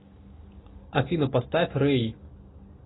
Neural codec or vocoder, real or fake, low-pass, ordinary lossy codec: none; real; 7.2 kHz; AAC, 16 kbps